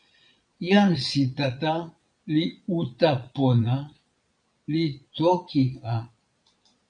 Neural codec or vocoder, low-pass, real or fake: vocoder, 22.05 kHz, 80 mel bands, Vocos; 9.9 kHz; fake